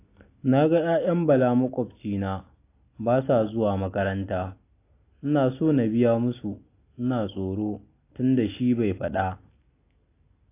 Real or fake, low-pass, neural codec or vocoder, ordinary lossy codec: real; 3.6 kHz; none; AAC, 24 kbps